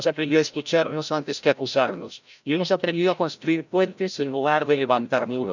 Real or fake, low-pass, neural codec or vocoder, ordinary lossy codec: fake; 7.2 kHz; codec, 16 kHz, 0.5 kbps, FreqCodec, larger model; none